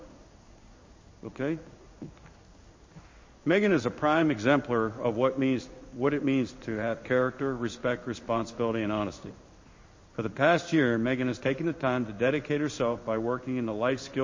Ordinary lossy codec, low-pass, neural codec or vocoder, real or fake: MP3, 32 kbps; 7.2 kHz; codec, 16 kHz in and 24 kHz out, 1 kbps, XY-Tokenizer; fake